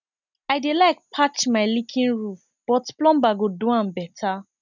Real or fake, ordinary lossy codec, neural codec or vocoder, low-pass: real; none; none; 7.2 kHz